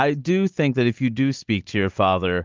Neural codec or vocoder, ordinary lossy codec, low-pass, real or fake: none; Opus, 32 kbps; 7.2 kHz; real